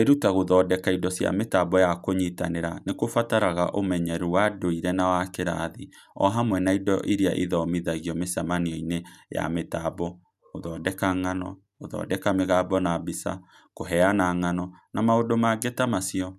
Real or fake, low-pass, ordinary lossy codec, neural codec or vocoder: real; 14.4 kHz; none; none